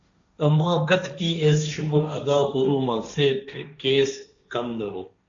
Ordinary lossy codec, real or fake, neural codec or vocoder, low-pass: MP3, 64 kbps; fake; codec, 16 kHz, 1.1 kbps, Voila-Tokenizer; 7.2 kHz